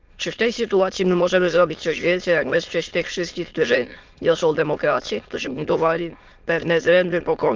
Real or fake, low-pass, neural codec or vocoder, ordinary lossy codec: fake; 7.2 kHz; autoencoder, 22.05 kHz, a latent of 192 numbers a frame, VITS, trained on many speakers; Opus, 24 kbps